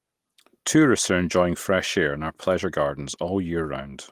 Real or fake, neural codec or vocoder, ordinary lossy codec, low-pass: real; none; Opus, 24 kbps; 14.4 kHz